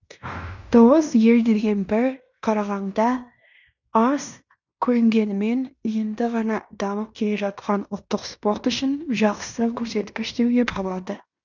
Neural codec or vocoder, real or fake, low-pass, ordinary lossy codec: codec, 16 kHz in and 24 kHz out, 0.9 kbps, LongCat-Audio-Codec, fine tuned four codebook decoder; fake; 7.2 kHz; none